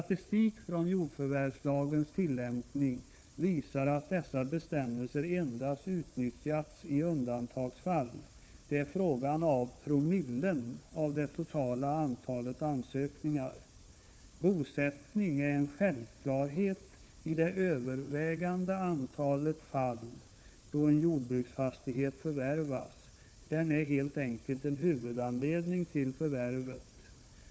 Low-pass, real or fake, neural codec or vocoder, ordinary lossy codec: none; fake; codec, 16 kHz, 4 kbps, FunCodec, trained on Chinese and English, 50 frames a second; none